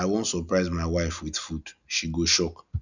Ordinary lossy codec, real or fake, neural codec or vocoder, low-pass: none; real; none; 7.2 kHz